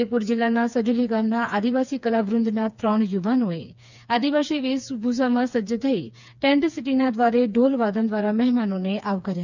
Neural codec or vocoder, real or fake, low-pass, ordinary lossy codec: codec, 16 kHz, 4 kbps, FreqCodec, smaller model; fake; 7.2 kHz; none